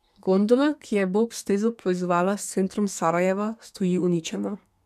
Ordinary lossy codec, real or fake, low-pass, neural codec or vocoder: none; fake; 14.4 kHz; codec, 32 kHz, 1.9 kbps, SNAC